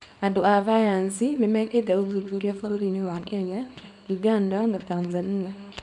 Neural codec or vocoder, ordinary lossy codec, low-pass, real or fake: codec, 24 kHz, 0.9 kbps, WavTokenizer, small release; none; 10.8 kHz; fake